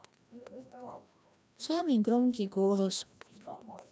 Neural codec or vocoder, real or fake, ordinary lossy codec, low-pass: codec, 16 kHz, 1 kbps, FreqCodec, larger model; fake; none; none